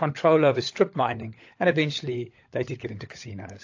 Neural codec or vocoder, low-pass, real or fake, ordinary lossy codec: codec, 16 kHz, 16 kbps, FunCodec, trained on LibriTTS, 50 frames a second; 7.2 kHz; fake; AAC, 48 kbps